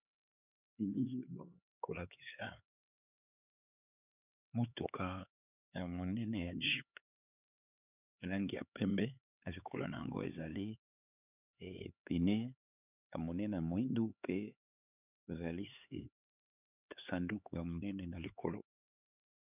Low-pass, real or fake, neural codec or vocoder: 3.6 kHz; fake; codec, 16 kHz, 4 kbps, X-Codec, HuBERT features, trained on LibriSpeech